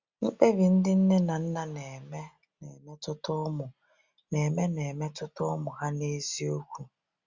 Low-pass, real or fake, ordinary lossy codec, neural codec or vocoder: 7.2 kHz; real; Opus, 64 kbps; none